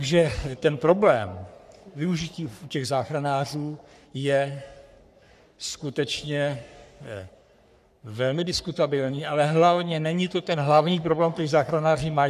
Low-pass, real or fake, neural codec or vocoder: 14.4 kHz; fake; codec, 44.1 kHz, 3.4 kbps, Pupu-Codec